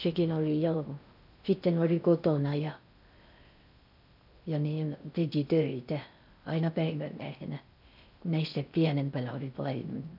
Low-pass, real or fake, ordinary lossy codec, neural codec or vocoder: 5.4 kHz; fake; none; codec, 16 kHz in and 24 kHz out, 0.6 kbps, FocalCodec, streaming, 2048 codes